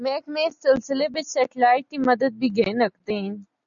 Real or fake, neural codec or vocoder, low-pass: real; none; 7.2 kHz